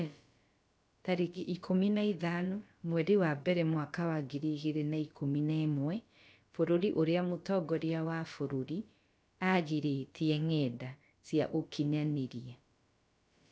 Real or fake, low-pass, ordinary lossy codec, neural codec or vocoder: fake; none; none; codec, 16 kHz, about 1 kbps, DyCAST, with the encoder's durations